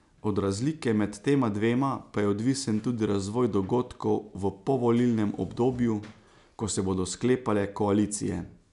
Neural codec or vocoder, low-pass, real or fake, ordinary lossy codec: none; 10.8 kHz; real; none